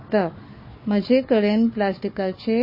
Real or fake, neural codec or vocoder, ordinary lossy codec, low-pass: fake; codec, 16 kHz, 4 kbps, FunCodec, trained on Chinese and English, 50 frames a second; MP3, 24 kbps; 5.4 kHz